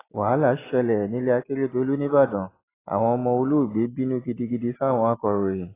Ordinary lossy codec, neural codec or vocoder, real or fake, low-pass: AAC, 16 kbps; none; real; 3.6 kHz